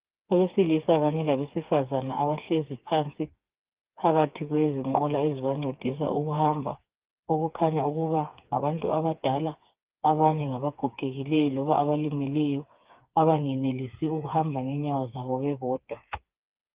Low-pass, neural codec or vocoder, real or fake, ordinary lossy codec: 3.6 kHz; codec, 16 kHz, 4 kbps, FreqCodec, smaller model; fake; Opus, 24 kbps